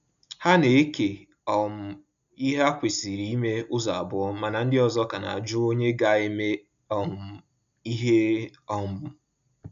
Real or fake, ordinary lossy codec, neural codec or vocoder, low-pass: real; none; none; 7.2 kHz